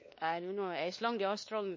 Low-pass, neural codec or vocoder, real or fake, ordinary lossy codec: 7.2 kHz; codec, 16 kHz, 2 kbps, X-Codec, WavLM features, trained on Multilingual LibriSpeech; fake; MP3, 32 kbps